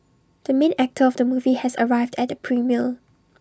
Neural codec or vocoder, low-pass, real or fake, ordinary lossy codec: none; none; real; none